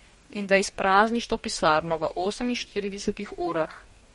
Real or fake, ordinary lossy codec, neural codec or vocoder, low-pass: fake; MP3, 48 kbps; codec, 44.1 kHz, 2.6 kbps, DAC; 19.8 kHz